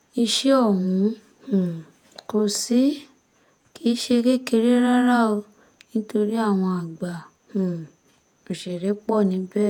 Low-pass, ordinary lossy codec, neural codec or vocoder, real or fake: 19.8 kHz; none; vocoder, 48 kHz, 128 mel bands, Vocos; fake